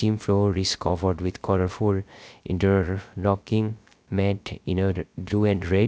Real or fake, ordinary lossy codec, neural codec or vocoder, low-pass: fake; none; codec, 16 kHz, 0.3 kbps, FocalCodec; none